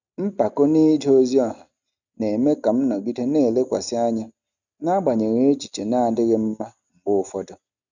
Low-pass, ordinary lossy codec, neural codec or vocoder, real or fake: 7.2 kHz; none; none; real